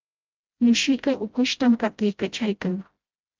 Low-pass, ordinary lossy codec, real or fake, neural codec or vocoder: 7.2 kHz; Opus, 24 kbps; fake; codec, 16 kHz, 0.5 kbps, FreqCodec, smaller model